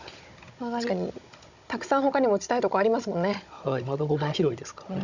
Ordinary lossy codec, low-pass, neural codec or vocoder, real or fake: none; 7.2 kHz; codec, 16 kHz, 16 kbps, FunCodec, trained on Chinese and English, 50 frames a second; fake